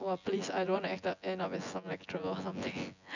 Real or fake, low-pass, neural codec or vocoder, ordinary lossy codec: fake; 7.2 kHz; vocoder, 24 kHz, 100 mel bands, Vocos; none